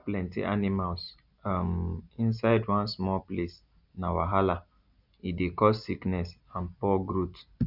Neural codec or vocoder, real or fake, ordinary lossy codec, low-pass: none; real; none; 5.4 kHz